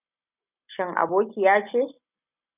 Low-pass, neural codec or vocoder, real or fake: 3.6 kHz; none; real